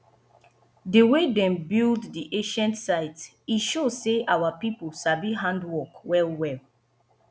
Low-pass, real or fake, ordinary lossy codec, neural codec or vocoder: none; real; none; none